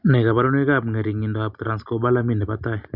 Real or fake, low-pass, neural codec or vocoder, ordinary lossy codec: real; 5.4 kHz; none; none